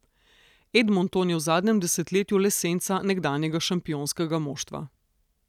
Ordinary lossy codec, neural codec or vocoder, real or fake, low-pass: none; none; real; 19.8 kHz